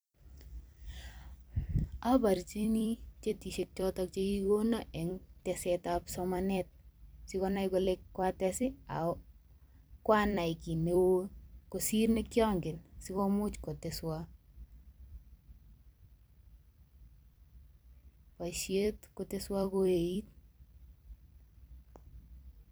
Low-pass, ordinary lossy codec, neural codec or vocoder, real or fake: none; none; vocoder, 44.1 kHz, 128 mel bands every 256 samples, BigVGAN v2; fake